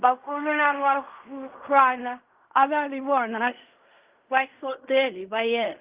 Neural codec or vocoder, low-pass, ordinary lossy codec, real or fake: codec, 16 kHz in and 24 kHz out, 0.4 kbps, LongCat-Audio-Codec, fine tuned four codebook decoder; 3.6 kHz; Opus, 24 kbps; fake